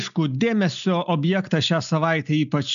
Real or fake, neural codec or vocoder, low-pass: real; none; 7.2 kHz